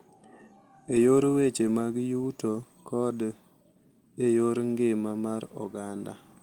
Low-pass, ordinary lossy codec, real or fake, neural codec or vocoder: 19.8 kHz; Opus, 24 kbps; real; none